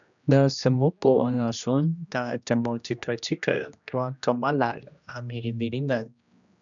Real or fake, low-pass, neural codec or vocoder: fake; 7.2 kHz; codec, 16 kHz, 1 kbps, X-Codec, HuBERT features, trained on general audio